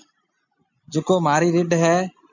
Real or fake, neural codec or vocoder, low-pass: real; none; 7.2 kHz